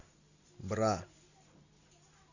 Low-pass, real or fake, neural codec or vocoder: 7.2 kHz; real; none